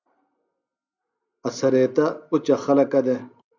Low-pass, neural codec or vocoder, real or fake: 7.2 kHz; none; real